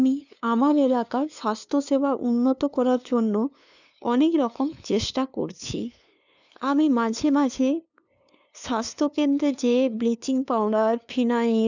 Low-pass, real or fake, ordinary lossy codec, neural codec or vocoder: 7.2 kHz; fake; none; codec, 16 kHz, 2 kbps, FunCodec, trained on LibriTTS, 25 frames a second